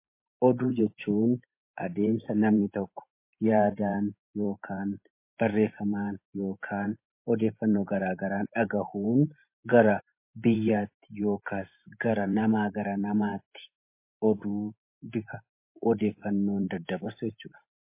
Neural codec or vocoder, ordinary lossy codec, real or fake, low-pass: vocoder, 44.1 kHz, 128 mel bands every 512 samples, BigVGAN v2; MP3, 24 kbps; fake; 3.6 kHz